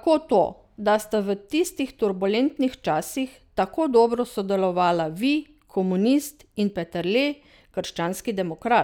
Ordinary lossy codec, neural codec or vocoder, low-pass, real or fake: none; none; 19.8 kHz; real